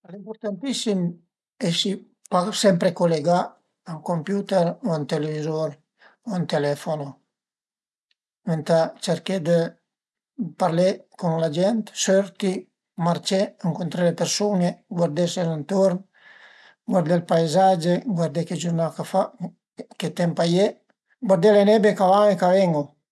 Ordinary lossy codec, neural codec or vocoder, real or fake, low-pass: none; none; real; none